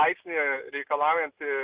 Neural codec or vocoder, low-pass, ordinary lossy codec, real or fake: none; 3.6 kHz; Opus, 16 kbps; real